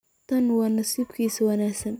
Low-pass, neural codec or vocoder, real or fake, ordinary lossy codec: none; none; real; none